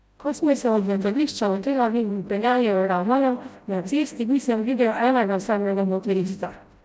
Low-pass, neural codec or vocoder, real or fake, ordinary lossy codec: none; codec, 16 kHz, 0.5 kbps, FreqCodec, smaller model; fake; none